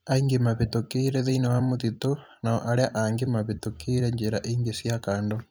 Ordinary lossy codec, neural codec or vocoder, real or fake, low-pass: none; vocoder, 44.1 kHz, 128 mel bands every 256 samples, BigVGAN v2; fake; none